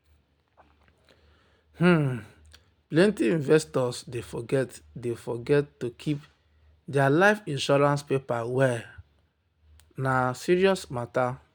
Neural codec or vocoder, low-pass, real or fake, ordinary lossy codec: none; none; real; none